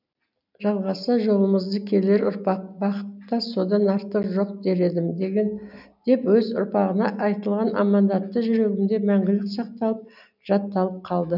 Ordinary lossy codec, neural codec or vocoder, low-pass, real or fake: none; none; 5.4 kHz; real